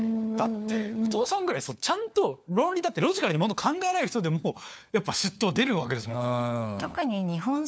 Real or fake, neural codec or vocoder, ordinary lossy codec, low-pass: fake; codec, 16 kHz, 4 kbps, FunCodec, trained on LibriTTS, 50 frames a second; none; none